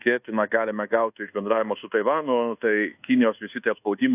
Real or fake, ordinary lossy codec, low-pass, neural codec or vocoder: fake; AAC, 32 kbps; 3.6 kHz; codec, 24 kHz, 1.2 kbps, DualCodec